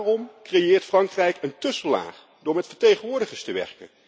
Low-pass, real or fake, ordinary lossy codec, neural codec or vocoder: none; real; none; none